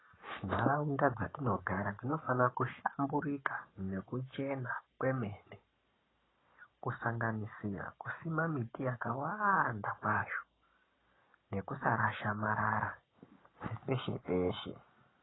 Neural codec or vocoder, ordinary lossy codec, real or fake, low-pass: codec, 44.1 kHz, 7.8 kbps, Pupu-Codec; AAC, 16 kbps; fake; 7.2 kHz